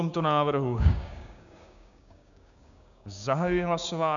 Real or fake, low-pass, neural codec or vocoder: fake; 7.2 kHz; codec, 16 kHz, 6 kbps, DAC